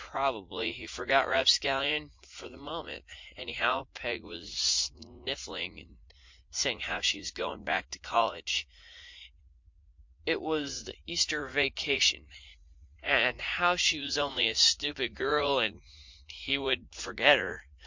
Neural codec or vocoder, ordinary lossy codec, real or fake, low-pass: vocoder, 44.1 kHz, 80 mel bands, Vocos; MP3, 48 kbps; fake; 7.2 kHz